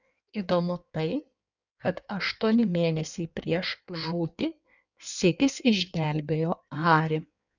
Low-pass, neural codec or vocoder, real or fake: 7.2 kHz; codec, 16 kHz in and 24 kHz out, 1.1 kbps, FireRedTTS-2 codec; fake